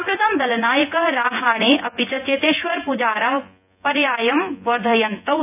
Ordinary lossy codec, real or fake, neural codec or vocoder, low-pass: none; fake; vocoder, 24 kHz, 100 mel bands, Vocos; 3.6 kHz